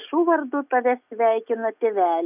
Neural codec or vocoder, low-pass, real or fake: none; 3.6 kHz; real